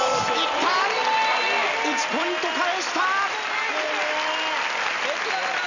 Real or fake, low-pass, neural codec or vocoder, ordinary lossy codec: real; 7.2 kHz; none; none